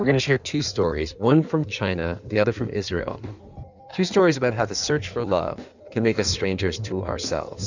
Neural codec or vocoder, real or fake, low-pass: codec, 16 kHz in and 24 kHz out, 1.1 kbps, FireRedTTS-2 codec; fake; 7.2 kHz